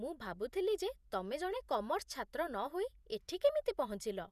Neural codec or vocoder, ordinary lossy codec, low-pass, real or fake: vocoder, 48 kHz, 128 mel bands, Vocos; none; 14.4 kHz; fake